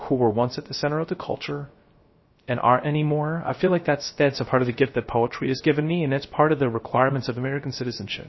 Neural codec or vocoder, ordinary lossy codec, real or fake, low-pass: codec, 16 kHz, 0.3 kbps, FocalCodec; MP3, 24 kbps; fake; 7.2 kHz